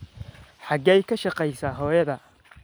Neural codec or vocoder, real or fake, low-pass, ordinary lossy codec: none; real; none; none